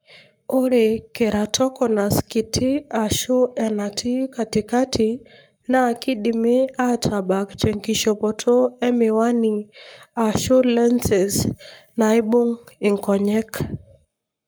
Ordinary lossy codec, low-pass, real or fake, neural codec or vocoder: none; none; fake; codec, 44.1 kHz, 7.8 kbps, Pupu-Codec